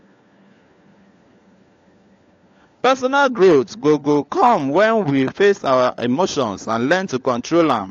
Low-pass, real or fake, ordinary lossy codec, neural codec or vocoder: 7.2 kHz; fake; AAC, 48 kbps; codec, 16 kHz, 4 kbps, FunCodec, trained on LibriTTS, 50 frames a second